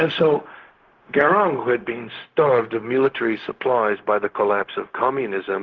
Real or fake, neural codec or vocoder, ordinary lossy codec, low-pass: fake; codec, 16 kHz, 0.4 kbps, LongCat-Audio-Codec; Opus, 16 kbps; 7.2 kHz